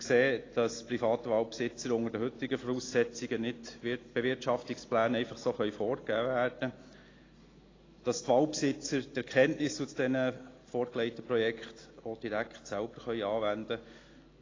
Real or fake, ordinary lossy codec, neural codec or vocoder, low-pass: real; AAC, 32 kbps; none; 7.2 kHz